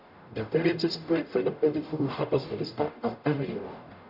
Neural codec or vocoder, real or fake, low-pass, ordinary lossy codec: codec, 44.1 kHz, 0.9 kbps, DAC; fake; 5.4 kHz; none